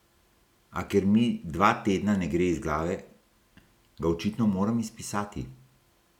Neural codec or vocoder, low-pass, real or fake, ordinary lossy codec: none; 19.8 kHz; real; none